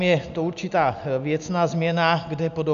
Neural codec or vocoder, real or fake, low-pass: none; real; 7.2 kHz